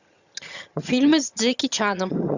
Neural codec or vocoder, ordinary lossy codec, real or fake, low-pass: vocoder, 22.05 kHz, 80 mel bands, HiFi-GAN; Opus, 64 kbps; fake; 7.2 kHz